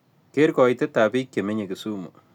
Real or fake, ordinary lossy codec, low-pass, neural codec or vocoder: real; none; 19.8 kHz; none